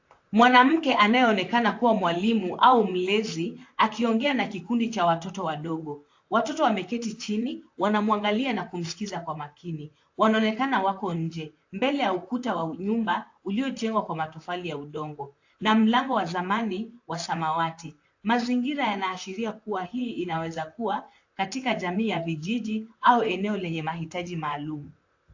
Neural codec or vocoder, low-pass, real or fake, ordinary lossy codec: vocoder, 44.1 kHz, 128 mel bands, Pupu-Vocoder; 7.2 kHz; fake; AAC, 48 kbps